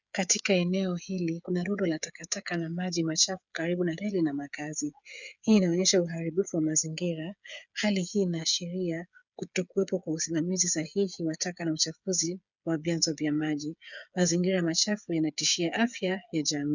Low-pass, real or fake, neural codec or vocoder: 7.2 kHz; fake; codec, 16 kHz, 8 kbps, FreqCodec, smaller model